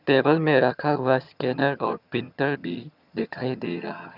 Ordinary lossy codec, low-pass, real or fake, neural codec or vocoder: none; 5.4 kHz; fake; vocoder, 22.05 kHz, 80 mel bands, HiFi-GAN